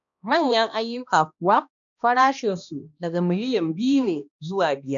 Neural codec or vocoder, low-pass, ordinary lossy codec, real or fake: codec, 16 kHz, 1 kbps, X-Codec, HuBERT features, trained on balanced general audio; 7.2 kHz; none; fake